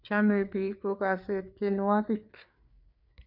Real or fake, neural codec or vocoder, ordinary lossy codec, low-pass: fake; codec, 16 kHz, 4 kbps, FreqCodec, larger model; none; 5.4 kHz